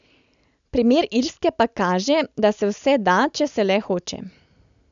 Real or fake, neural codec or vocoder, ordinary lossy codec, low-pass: real; none; none; 7.2 kHz